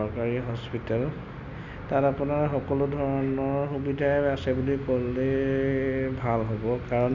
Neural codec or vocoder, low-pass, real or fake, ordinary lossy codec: none; 7.2 kHz; real; none